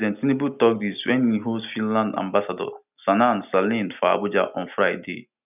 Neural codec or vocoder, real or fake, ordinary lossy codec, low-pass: none; real; none; 3.6 kHz